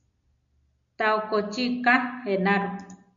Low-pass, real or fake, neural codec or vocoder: 7.2 kHz; real; none